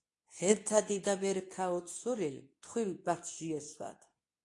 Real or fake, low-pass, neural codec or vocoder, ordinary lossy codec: fake; 10.8 kHz; codec, 24 kHz, 0.9 kbps, WavTokenizer, medium speech release version 2; AAC, 48 kbps